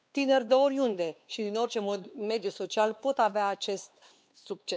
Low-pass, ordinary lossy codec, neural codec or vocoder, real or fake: none; none; codec, 16 kHz, 2 kbps, X-Codec, WavLM features, trained on Multilingual LibriSpeech; fake